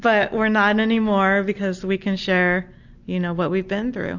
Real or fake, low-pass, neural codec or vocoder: real; 7.2 kHz; none